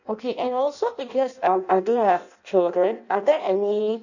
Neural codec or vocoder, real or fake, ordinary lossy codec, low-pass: codec, 16 kHz in and 24 kHz out, 0.6 kbps, FireRedTTS-2 codec; fake; none; 7.2 kHz